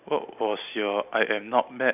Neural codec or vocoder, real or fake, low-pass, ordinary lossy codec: none; real; 3.6 kHz; none